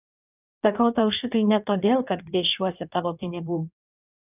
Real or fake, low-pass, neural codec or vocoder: fake; 3.6 kHz; codec, 16 kHz in and 24 kHz out, 1.1 kbps, FireRedTTS-2 codec